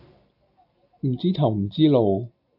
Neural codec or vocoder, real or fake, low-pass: none; real; 5.4 kHz